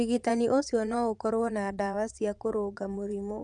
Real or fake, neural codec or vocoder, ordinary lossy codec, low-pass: fake; vocoder, 22.05 kHz, 80 mel bands, Vocos; none; 9.9 kHz